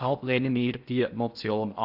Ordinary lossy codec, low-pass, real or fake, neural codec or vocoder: none; 5.4 kHz; fake; codec, 16 kHz in and 24 kHz out, 0.6 kbps, FocalCodec, streaming, 2048 codes